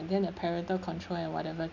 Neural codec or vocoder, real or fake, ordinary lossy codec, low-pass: none; real; none; 7.2 kHz